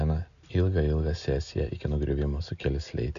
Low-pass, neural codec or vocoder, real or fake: 7.2 kHz; none; real